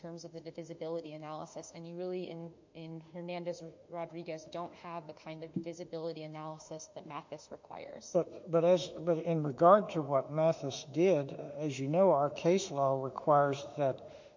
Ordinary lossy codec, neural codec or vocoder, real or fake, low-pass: MP3, 48 kbps; autoencoder, 48 kHz, 32 numbers a frame, DAC-VAE, trained on Japanese speech; fake; 7.2 kHz